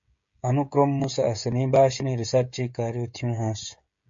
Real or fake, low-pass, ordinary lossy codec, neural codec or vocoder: fake; 7.2 kHz; MP3, 48 kbps; codec, 16 kHz, 16 kbps, FreqCodec, smaller model